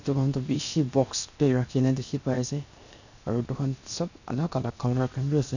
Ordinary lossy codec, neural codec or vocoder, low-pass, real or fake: none; codec, 16 kHz, 0.7 kbps, FocalCodec; 7.2 kHz; fake